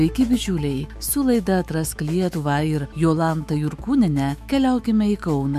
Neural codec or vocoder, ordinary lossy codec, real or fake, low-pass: autoencoder, 48 kHz, 128 numbers a frame, DAC-VAE, trained on Japanese speech; MP3, 96 kbps; fake; 14.4 kHz